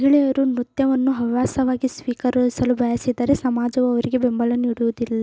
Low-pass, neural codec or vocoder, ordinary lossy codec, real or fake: none; none; none; real